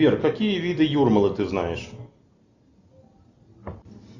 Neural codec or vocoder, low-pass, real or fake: none; 7.2 kHz; real